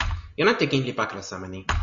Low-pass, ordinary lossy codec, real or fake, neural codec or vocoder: 7.2 kHz; Opus, 64 kbps; real; none